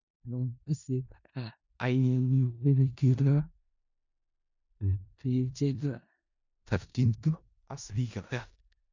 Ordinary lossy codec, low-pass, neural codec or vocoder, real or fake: none; 7.2 kHz; codec, 16 kHz in and 24 kHz out, 0.4 kbps, LongCat-Audio-Codec, four codebook decoder; fake